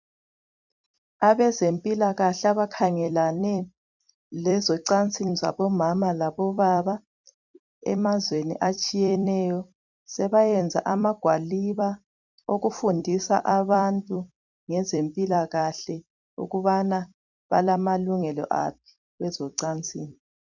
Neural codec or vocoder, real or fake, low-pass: vocoder, 44.1 kHz, 128 mel bands every 256 samples, BigVGAN v2; fake; 7.2 kHz